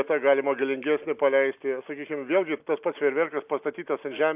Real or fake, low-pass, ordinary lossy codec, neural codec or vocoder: real; 3.6 kHz; AAC, 32 kbps; none